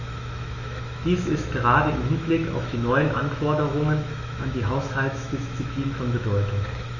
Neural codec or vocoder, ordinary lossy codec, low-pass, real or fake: autoencoder, 48 kHz, 128 numbers a frame, DAC-VAE, trained on Japanese speech; none; 7.2 kHz; fake